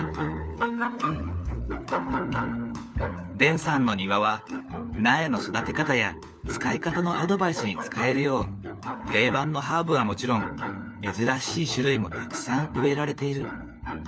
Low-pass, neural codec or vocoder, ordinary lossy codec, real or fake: none; codec, 16 kHz, 4 kbps, FunCodec, trained on LibriTTS, 50 frames a second; none; fake